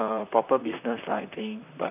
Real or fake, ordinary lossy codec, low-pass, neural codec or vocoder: fake; none; 3.6 kHz; vocoder, 44.1 kHz, 128 mel bands, Pupu-Vocoder